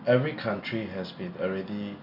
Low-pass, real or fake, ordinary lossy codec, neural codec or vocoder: 5.4 kHz; real; none; none